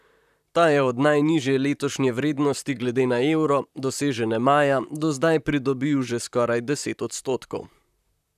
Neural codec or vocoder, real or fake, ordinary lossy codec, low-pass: vocoder, 44.1 kHz, 128 mel bands, Pupu-Vocoder; fake; none; 14.4 kHz